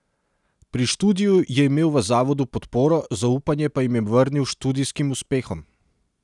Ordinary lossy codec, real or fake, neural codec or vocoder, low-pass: none; real; none; 10.8 kHz